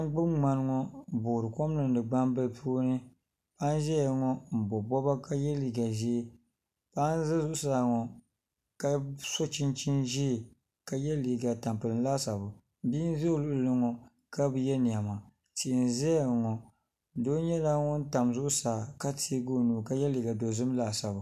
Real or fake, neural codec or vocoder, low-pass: real; none; 14.4 kHz